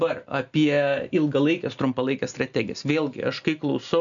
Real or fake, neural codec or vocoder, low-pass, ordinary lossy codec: real; none; 7.2 kHz; MP3, 96 kbps